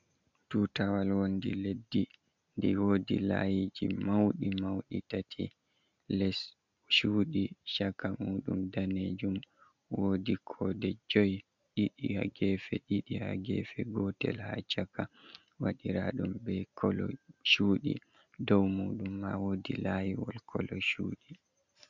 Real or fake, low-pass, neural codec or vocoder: real; 7.2 kHz; none